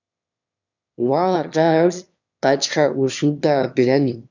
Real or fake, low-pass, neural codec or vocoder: fake; 7.2 kHz; autoencoder, 22.05 kHz, a latent of 192 numbers a frame, VITS, trained on one speaker